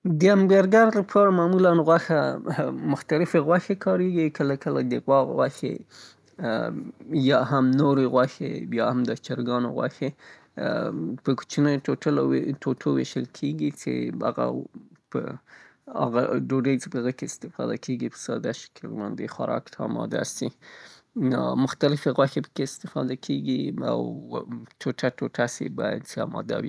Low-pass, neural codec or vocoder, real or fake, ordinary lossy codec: none; none; real; none